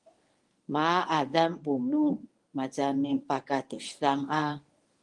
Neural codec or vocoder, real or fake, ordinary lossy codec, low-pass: codec, 24 kHz, 0.9 kbps, WavTokenizer, medium speech release version 1; fake; Opus, 24 kbps; 10.8 kHz